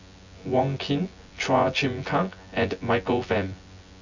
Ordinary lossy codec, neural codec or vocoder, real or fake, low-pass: none; vocoder, 24 kHz, 100 mel bands, Vocos; fake; 7.2 kHz